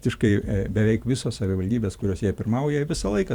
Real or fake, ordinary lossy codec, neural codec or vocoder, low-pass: fake; Opus, 64 kbps; vocoder, 44.1 kHz, 128 mel bands every 512 samples, BigVGAN v2; 19.8 kHz